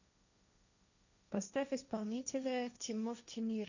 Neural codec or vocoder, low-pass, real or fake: codec, 16 kHz, 1.1 kbps, Voila-Tokenizer; 7.2 kHz; fake